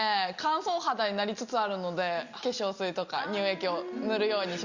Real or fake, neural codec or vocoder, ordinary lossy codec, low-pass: real; none; Opus, 64 kbps; 7.2 kHz